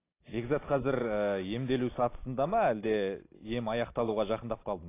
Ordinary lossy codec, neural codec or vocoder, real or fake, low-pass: AAC, 24 kbps; none; real; 3.6 kHz